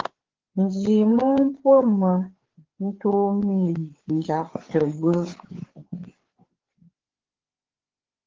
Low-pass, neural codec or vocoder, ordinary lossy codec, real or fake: 7.2 kHz; codec, 16 kHz, 4 kbps, FreqCodec, larger model; Opus, 16 kbps; fake